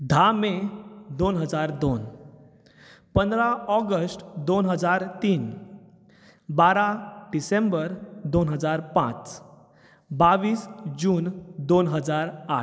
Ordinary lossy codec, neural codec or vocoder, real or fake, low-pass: none; none; real; none